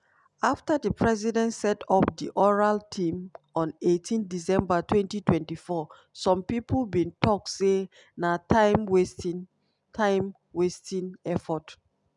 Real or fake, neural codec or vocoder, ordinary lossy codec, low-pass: real; none; none; 10.8 kHz